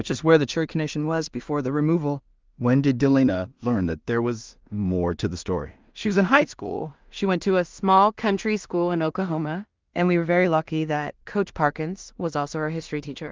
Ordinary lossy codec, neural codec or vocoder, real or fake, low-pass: Opus, 32 kbps; codec, 16 kHz in and 24 kHz out, 0.4 kbps, LongCat-Audio-Codec, two codebook decoder; fake; 7.2 kHz